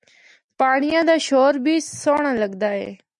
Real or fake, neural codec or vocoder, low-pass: real; none; 10.8 kHz